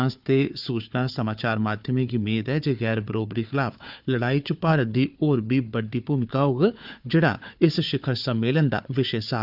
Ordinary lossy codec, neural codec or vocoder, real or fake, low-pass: none; codec, 16 kHz, 4 kbps, FunCodec, trained on Chinese and English, 50 frames a second; fake; 5.4 kHz